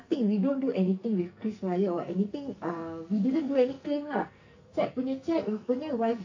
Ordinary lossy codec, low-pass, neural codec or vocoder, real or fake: AAC, 48 kbps; 7.2 kHz; codec, 44.1 kHz, 2.6 kbps, SNAC; fake